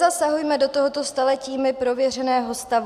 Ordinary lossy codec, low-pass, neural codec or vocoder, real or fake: AAC, 96 kbps; 14.4 kHz; none; real